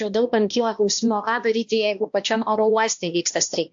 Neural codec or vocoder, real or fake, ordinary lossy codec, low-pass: codec, 16 kHz, 1 kbps, X-Codec, HuBERT features, trained on balanced general audio; fake; AAC, 64 kbps; 7.2 kHz